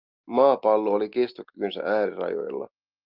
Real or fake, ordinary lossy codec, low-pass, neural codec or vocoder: real; Opus, 24 kbps; 5.4 kHz; none